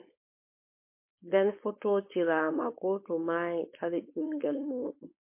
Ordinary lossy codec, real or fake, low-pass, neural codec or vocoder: MP3, 24 kbps; fake; 3.6 kHz; codec, 16 kHz, 4.8 kbps, FACodec